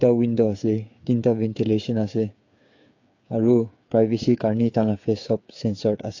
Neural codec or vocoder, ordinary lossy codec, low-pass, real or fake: codec, 16 kHz, 8 kbps, FreqCodec, smaller model; AAC, 48 kbps; 7.2 kHz; fake